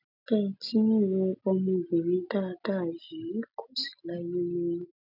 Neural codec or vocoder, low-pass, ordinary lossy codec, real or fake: none; 5.4 kHz; none; real